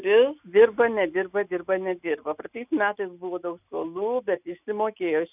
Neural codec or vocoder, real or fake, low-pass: none; real; 3.6 kHz